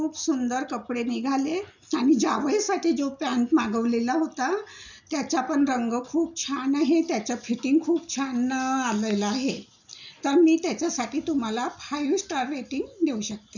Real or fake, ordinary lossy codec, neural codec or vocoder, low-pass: real; none; none; 7.2 kHz